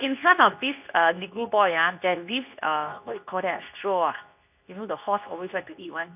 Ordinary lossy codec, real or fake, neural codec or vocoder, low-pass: none; fake; codec, 24 kHz, 0.9 kbps, WavTokenizer, medium speech release version 2; 3.6 kHz